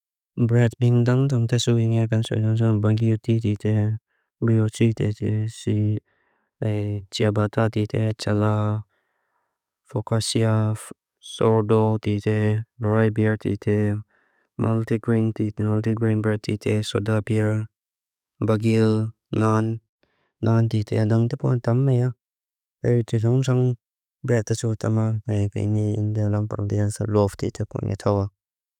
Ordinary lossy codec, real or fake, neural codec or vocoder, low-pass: none; fake; codec, 44.1 kHz, 7.8 kbps, DAC; 19.8 kHz